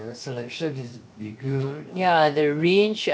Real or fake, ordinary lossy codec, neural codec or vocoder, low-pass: fake; none; codec, 16 kHz, 0.7 kbps, FocalCodec; none